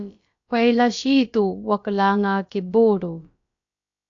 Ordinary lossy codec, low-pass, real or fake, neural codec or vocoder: AAC, 64 kbps; 7.2 kHz; fake; codec, 16 kHz, about 1 kbps, DyCAST, with the encoder's durations